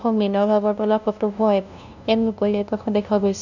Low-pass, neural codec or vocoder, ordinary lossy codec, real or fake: 7.2 kHz; codec, 16 kHz, 0.5 kbps, FunCodec, trained on LibriTTS, 25 frames a second; none; fake